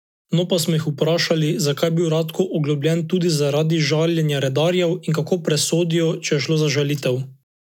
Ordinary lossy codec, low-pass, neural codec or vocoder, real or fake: none; 19.8 kHz; none; real